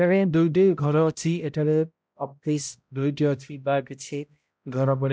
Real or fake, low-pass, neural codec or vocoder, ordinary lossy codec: fake; none; codec, 16 kHz, 0.5 kbps, X-Codec, HuBERT features, trained on balanced general audio; none